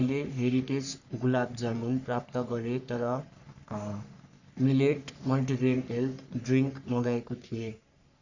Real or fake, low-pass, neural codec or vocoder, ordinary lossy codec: fake; 7.2 kHz; codec, 44.1 kHz, 3.4 kbps, Pupu-Codec; none